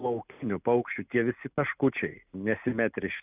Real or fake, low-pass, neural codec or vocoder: real; 3.6 kHz; none